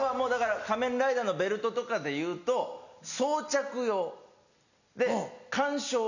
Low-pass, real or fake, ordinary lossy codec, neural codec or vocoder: 7.2 kHz; real; none; none